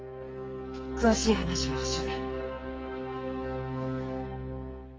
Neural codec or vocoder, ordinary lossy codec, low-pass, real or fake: codec, 44.1 kHz, 2.6 kbps, SNAC; Opus, 24 kbps; 7.2 kHz; fake